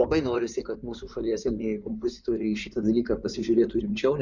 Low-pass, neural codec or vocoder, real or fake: 7.2 kHz; codec, 16 kHz in and 24 kHz out, 2.2 kbps, FireRedTTS-2 codec; fake